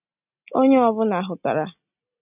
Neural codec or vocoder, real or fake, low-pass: none; real; 3.6 kHz